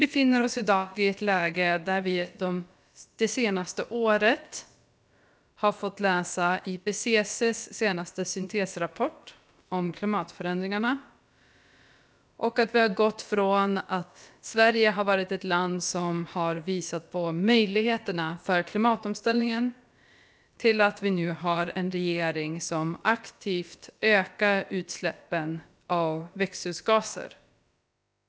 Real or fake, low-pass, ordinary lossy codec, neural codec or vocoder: fake; none; none; codec, 16 kHz, about 1 kbps, DyCAST, with the encoder's durations